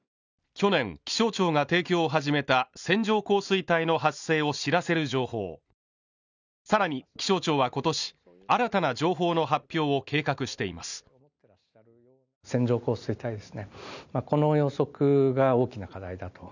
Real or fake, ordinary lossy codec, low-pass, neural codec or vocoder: real; none; 7.2 kHz; none